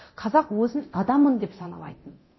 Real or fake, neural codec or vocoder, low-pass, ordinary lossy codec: fake; codec, 24 kHz, 0.9 kbps, DualCodec; 7.2 kHz; MP3, 24 kbps